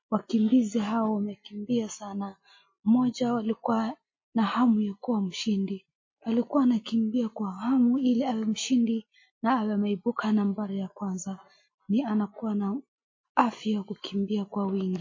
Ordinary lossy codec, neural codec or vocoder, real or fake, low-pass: MP3, 32 kbps; none; real; 7.2 kHz